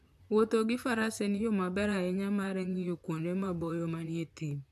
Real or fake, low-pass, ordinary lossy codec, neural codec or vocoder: fake; 14.4 kHz; AAC, 96 kbps; vocoder, 44.1 kHz, 128 mel bands, Pupu-Vocoder